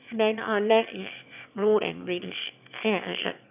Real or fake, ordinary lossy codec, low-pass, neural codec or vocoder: fake; none; 3.6 kHz; autoencoder, 22.05 kHz, a latent of 192 numbers a frame, VITS, trained on one speaker